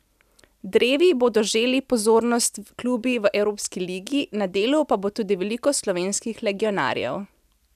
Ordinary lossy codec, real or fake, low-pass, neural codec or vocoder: none; real; 14.4 kHz; none